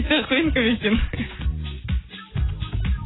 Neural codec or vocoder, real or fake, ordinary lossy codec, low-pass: none; real; AAC, 16 kbps; 7.2 kHz